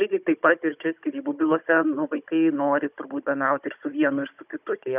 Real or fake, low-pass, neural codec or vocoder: fake; 3.6 kHz; codec, 16 kHz, 16 kbps, FunCodec, trained on Chinese and English, 50 frames a second